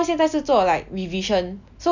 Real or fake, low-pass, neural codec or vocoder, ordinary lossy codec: real; 7.2 kHz; none; none